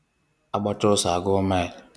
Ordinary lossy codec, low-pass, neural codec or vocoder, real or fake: none; none; none; real